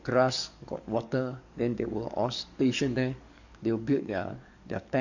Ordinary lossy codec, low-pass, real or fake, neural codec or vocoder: none; 7.2 kHz; fake; codec, 44.1 kHz, 7.8 kbps, DAC